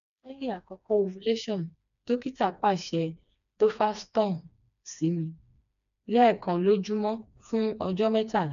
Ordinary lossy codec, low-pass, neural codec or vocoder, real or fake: none; 7.2 kHz; codec, 16 kHz, 2 kbps, FreqCodec, smaller model; fake